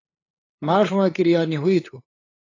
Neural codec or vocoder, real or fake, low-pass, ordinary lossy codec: codec, 16 kHz, 8 kbps, FunCodec, trained on LibriTTS, 25 frames a second; fake; 7.2 kHz; AAC, 32 kbps